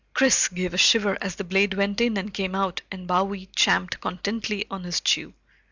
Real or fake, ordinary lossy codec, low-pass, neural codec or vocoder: real; Opus, 64 kbps; 7.2 kHz; none